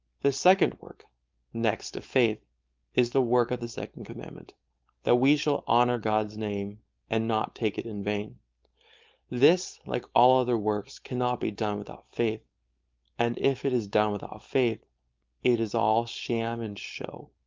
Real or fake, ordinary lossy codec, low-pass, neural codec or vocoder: fake; Opus, 24 kbps; 7.2 kHz; codec, 16 kHz, 4.8 kbps, FACodec